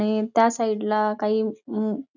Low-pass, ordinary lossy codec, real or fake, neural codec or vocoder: 7.2 kHz; none; real; none